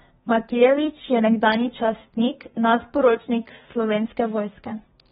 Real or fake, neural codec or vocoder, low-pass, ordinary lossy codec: fake; codec, 32 kHz, 1.9 kbps, SNAC; 14.4 kHz; AAC, 16 kbps